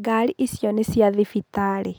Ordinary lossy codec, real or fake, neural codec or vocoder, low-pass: none; real; none; none